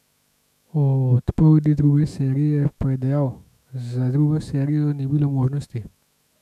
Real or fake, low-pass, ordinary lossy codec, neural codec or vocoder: fake; 14.4 kHz; none; autoencoder, 48 kHz, 128 numbers a frame, DAC-VAE, trained on Japanese speech